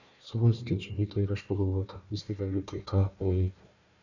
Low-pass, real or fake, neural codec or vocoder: 7.2 kHz; fake; codec, 16 kHz, 1 kbps, FunCodec, trained on Chinese and English, 50 frames a second